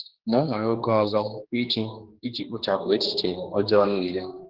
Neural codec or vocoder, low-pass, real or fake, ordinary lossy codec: codec, 16 kHz, 2 kbps, X-Codec, HuBERT features, trained on general audio; 5.4 kHz; fake; Opus, 16 kbps